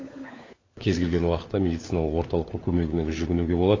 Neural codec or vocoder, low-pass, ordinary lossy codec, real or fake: codec, 16 kHz, 4 kbps, FunCodec, trained on LibriTTS, 50 frames a second; 7.2 kHz; AAC, 32 kbps; fake